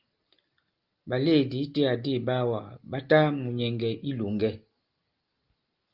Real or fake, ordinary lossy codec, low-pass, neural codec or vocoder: real; Opus, 32 kbps; 5.4 kHz; none